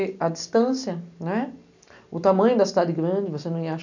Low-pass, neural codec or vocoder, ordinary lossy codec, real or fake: 7.2 kHz; none; none; real